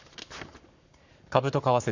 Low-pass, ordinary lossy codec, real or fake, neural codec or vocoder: 7.2 kHz; none; fake; codec, 44.1 kHz, 7.8 kbps, Pupu-Codec